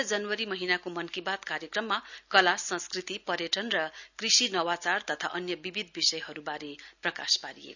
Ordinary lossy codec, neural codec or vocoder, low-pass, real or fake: none; none; 7.2 kHz; real